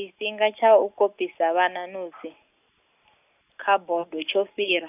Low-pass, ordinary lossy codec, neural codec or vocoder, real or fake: 3.6 kHz; none; none; real